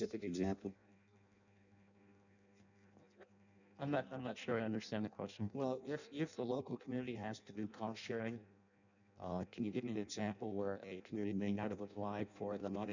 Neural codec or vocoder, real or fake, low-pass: codec, 16 kHz in and 24 kHz out, 0.6 kbps, FireRedTTS-2 codec; fake; 7.2 kHz